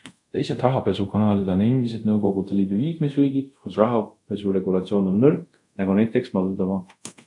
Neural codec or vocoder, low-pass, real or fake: codec, 24 kHz, 0.5 kbps, DualCodec; 10.8 kHz; fake